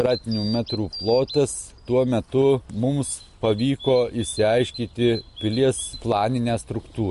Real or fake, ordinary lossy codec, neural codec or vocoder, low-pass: real; MP3, 48 kbps; none; 14.4 kHz